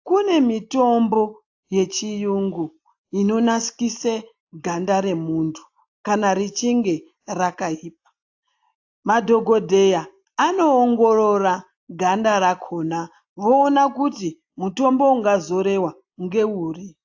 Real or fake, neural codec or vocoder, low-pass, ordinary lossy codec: real; none; 7.2 kHz; AAC, 48 kbps